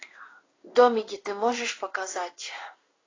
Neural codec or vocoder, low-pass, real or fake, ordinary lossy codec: codec, 16 kHz in and 24 kHz out, 1 kbps, XY-Tokenizer; 7.2 kHz; fake; AAC, 32 kbps